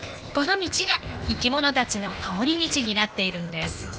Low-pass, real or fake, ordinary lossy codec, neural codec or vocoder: none; fake; none; codec, 16 kHz, 0.8 kbps, ZipCodec